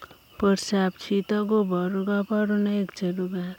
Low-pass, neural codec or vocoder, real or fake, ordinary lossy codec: 19.8 kHz; none; real; none